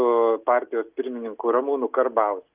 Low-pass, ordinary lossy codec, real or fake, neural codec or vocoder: 3.6 kHz; Opus, 24 kbps; real; none